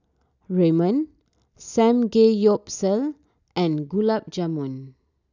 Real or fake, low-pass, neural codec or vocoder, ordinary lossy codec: real; 7.2 kHz; none; none